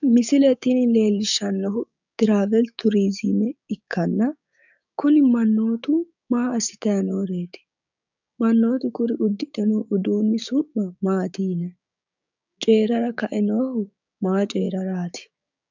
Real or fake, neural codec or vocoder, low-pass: fake; codec, 16 kHz, 6 kbps, DAC; 7.2 kHz